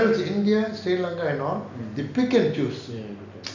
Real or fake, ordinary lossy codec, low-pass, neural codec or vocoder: real; none; 7.2 kHz; none